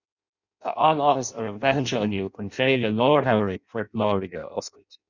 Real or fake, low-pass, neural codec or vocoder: fake; 7.2 kHz; codec, 16 kHz in and 24 kHz out, 0.6 kbps, FireRedTTS-2 codec